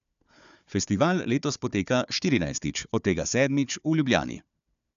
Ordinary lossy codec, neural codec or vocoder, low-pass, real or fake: none; codec, 16 kHz, 4 kbps, FunCodec, trained on Chinese and English, 50 frames a second; 7.2 kHz; fake